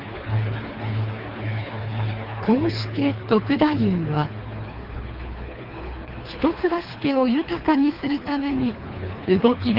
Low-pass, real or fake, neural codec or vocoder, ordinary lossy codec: 5.4 kHz; fake; codec, 24 kHz, 3 kbps, HILCodec; Opus, 32 kbps